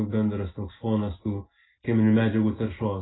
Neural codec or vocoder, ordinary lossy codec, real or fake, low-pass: none; AAC, 16 kbps; real; 7.2 kHz